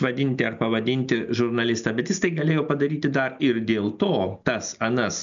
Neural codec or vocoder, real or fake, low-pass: none; real; 7.2 kHz